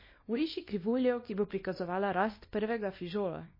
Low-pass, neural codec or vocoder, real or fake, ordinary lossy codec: 5.4 kHz; codec, 24 kHz, 0.9 kbps, WavTokenizer, small release; fake; MP3, 24 kbps